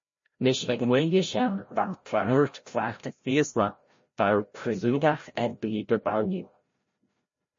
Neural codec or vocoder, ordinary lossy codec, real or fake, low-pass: codec, 16 kHz, 0.5 kbps, FreqCodec, larger model; MP3, 32 kbps; fake; 7.2 kHz